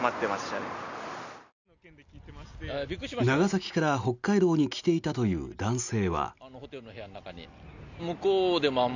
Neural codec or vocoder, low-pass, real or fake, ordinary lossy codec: none; 7.2 kHz; real; none